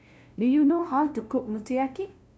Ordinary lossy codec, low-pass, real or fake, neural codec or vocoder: none; none; fake; codec, 16 kHz, 0.5 kbps, FunCodec, trained on LibriTTS, 25 frames a second